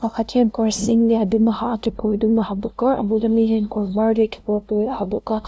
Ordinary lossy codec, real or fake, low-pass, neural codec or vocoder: none; fake; none; codec, 16 kHz, 0.5 kbps, FunCodec, trained on LibriTTS, 25 frames a second